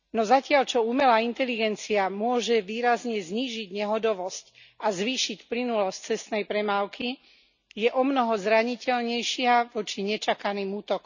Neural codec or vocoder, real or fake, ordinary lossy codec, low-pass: none; real; none; 7.2 kHz